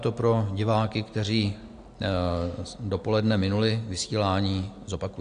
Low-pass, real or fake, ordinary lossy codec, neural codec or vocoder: 9.9 kHz; real; AAC, 64 kbps; none